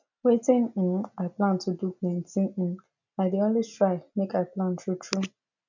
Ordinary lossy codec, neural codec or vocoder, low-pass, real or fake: none; none; 7.2 kHz; real